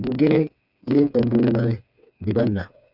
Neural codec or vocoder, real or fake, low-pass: codec, 16 kHz, 4 kbps, FreqCodec, smaller model; fake; 5.4 kHz